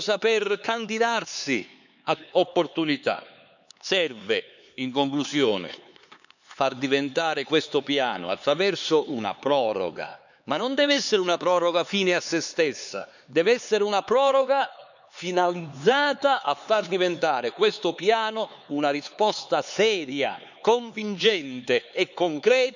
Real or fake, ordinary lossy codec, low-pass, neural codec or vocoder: fake; none; 7.2 kHz; codec, 16 kHz, 4 kbps, X-Codec, HuBERT features, trained on LibriSpeech